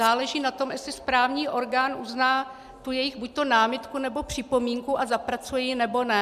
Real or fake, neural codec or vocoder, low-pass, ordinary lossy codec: real; none; 14.4 kHz; MP3, 96 kbps